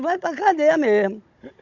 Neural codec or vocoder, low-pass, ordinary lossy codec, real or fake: codec, 16 kHz, 16 kbps, FunCodec, trained on Chinese and English, 50 frames a second; 7.2 kHz; Opus, 64 kbps; fake